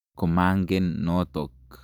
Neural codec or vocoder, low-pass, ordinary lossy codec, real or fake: vocoder, 44.1 kHz, 128 mel bands every 512 samples, BigVGAN v2; 19.8 kHz; none; fake